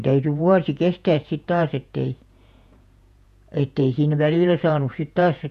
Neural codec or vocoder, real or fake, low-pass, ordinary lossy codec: vocoder, 48 kHz, 128 mel bands, Vocos; fake; 14.4 kHz; none